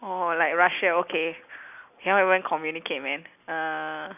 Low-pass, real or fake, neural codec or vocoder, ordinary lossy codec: 3.6 kHz; real; none; none